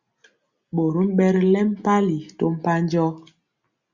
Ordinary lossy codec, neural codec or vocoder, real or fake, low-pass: Opus, 64 kbps; none; real; 7.2 kHz